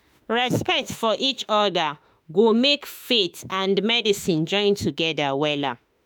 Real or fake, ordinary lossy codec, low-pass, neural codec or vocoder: fake; none; none; autoencoder, 48 kHz, 32 numbers a frame, DAC-VAE, trained on Japanese speech